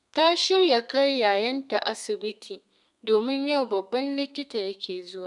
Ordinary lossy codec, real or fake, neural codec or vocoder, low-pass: MP3, 96 kbps; fake; codec, 32 kHz, 1.9 kbps, SNAC; 10.8 kHz